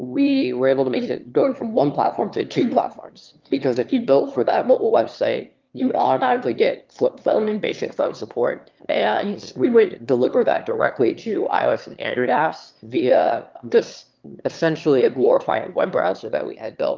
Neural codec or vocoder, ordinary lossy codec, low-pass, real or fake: autoencoder, 22.05 kHz, a latent of 192 numbers a frame, VITS, trained on one speaker; Opus, 32 kbps; 7.2 kHz; fake